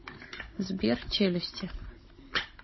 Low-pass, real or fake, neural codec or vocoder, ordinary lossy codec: 7.2 kHz; fake; codec, 16 kHz, 16 kbps, FunCodec, trained on Chinese and English, 50 frames a second; MP3, 24 kbps